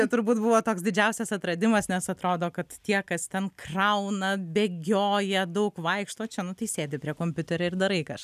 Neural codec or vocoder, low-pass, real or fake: none; 14.4 kHz; real